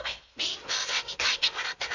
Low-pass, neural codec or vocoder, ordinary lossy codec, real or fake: 7.2 kHz; codec, 16 kHz in and 24 kHz out, 0.6 kbps, FocalCodec, streaming, 2048 codes; none; fake